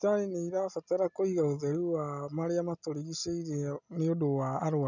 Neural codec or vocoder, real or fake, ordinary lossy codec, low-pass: none; real; none; 7.2 kHz